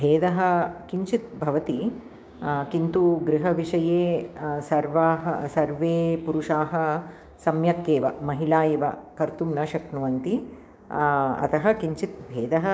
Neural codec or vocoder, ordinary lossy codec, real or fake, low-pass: codec, 16 kHz, 6 kbps, DAC; none; fake; none